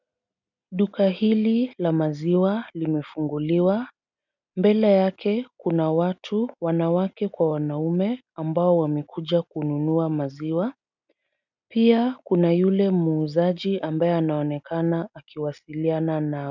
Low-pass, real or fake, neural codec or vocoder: 7.2 kHz; real; none